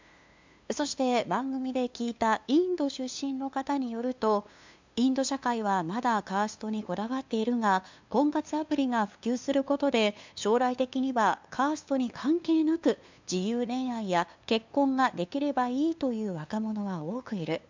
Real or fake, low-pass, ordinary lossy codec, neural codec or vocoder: fake; 7.2 kHz; MP3, 64 kbps; codec, 16 kHz, 2 kbps, FunCodec, trained on LibriTTS, 25 frames a second